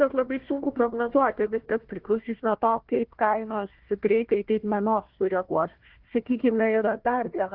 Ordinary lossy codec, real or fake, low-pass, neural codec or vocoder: Opus, 16 kbps; fake; 5.4 kHz; codec, 16 kHz, 1 kbps, FunCodec, trained on Chinese and English, 50 frames a second